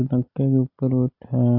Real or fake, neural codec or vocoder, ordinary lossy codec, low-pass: real; none; AAC, 32 kbps; 5.4 kHz